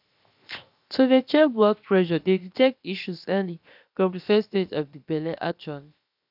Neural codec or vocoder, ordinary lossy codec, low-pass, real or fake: codec, 16 kHz, 0.7 kbps, FocalCodec; AAC, 48 kbps; 5.4 kHz; fake